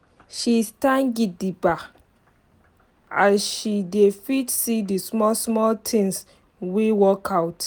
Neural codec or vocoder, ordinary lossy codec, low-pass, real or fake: none; none; none; real